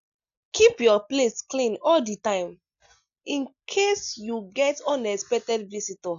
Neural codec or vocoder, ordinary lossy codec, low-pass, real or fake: none; none; 7.2 kHz; real